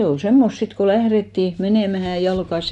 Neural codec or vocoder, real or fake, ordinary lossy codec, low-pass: none; real; none; 10.8 kHz